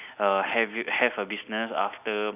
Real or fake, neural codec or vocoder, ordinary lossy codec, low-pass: real; none; none; 3.6 kHz